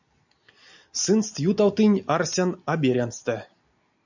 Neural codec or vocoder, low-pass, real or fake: none; 7.2 kHz; real